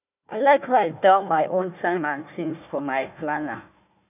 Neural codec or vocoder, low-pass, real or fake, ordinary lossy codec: codec, 16 kHz, 1 kbps, FunCodec, trained on Chinese and English, 50 frames a second; 3.6 kHz; fake; none